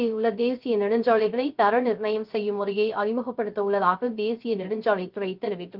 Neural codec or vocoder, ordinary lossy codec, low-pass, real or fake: codec, 16 kHz, 0.3 kbps, FocalCodec; Opus, 32 kbps; 5.4 kHz; fake